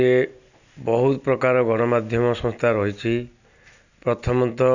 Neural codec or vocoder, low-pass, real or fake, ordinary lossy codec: none; 7.2 kHz; real; none